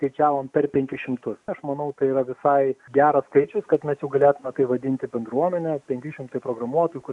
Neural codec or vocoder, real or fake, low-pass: none; real; 10.8 kHz